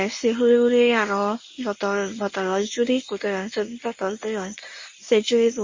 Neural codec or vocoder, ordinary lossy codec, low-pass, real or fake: codec, 24 kHz, 0.9 kbps, WavTokenizer, medium speech release version 1; MP3, 32 kbps; 7.2 kHz; fake